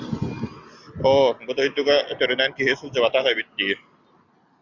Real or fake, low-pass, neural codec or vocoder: real; 7.2 kHz; none